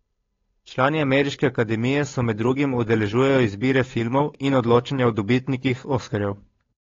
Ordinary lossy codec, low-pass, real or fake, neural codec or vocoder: AAC, 32 kbps; 7.2 kHz; fake; codec, 16 kHz, 8 kbps, FunCodec, trained on Chinese and English, 25 frames a second